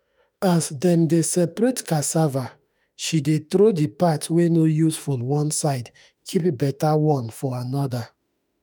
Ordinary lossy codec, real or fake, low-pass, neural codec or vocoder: none; fake; none; autoencoder, 48 kHz, 32 numbers a frame, DAC-VAE, trained on Japanese speech